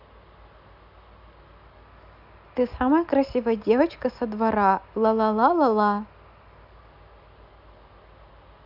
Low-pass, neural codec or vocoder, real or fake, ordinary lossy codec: 5.4 kHz; none; real; AAC, 48 kbps